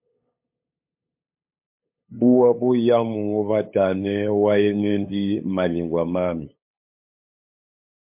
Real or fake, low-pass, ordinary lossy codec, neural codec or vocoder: fake; 3.6 kHz; MP3, 32 kbps; codec, 16 kHz, 8 kbps, FunCodec, trained on LibriTTS, 25 frames a second